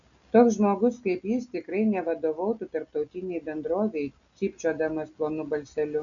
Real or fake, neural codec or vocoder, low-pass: real; none; 7.2 kHz